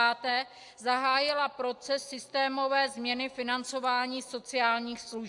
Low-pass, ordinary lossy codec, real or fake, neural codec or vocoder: 10.8 kHz; AAC, 64 kbps; real; none